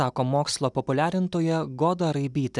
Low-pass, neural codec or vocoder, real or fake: 10.8 kHz; none; real